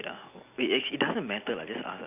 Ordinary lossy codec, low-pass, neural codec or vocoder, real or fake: none; 3.6 kHz; none; real